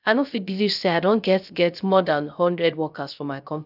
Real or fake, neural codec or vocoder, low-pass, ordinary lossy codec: fake; codec, 16 kHz, 0.3 kbps, FocalCodec; 5.4 kHz; none